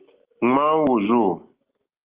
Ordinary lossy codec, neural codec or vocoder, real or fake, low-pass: Opus, 32 kbps; none; real; 3.6 kHz